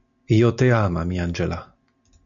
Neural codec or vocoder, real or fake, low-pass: none; real; 7.2 kHz